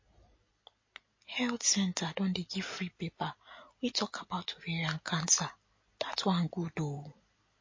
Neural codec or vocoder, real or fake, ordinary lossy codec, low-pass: none; real; MP3, 32 kbps; 7.2 kHz